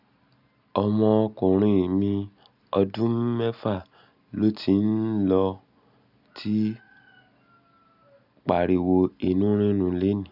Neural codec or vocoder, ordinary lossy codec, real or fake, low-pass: none; none; real; 5.4 kHz